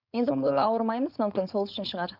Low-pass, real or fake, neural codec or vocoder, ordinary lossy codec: 5.4 kHz; fake; codec, 16 kHz, 4.8 kbps, FACodec; none